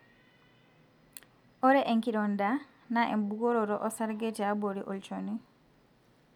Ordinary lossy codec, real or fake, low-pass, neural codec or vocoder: none; real; 19.8 kHz; none